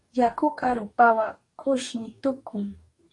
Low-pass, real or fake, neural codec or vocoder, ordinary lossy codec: 10.8 kHz; fake; codec, 44.1 kHz, 2.6 kbps, DAC; AAC, 48 kbps